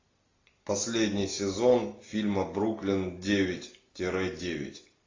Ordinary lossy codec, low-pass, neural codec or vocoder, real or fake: AAC, 32 kbps; 7.2 kHz; none; real